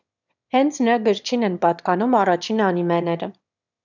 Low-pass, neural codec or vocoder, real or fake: 7.2 kHz; autoencoder, 22.05 kHz, a latent of 192 numbers a frame, VITS, trained on one speaker; fake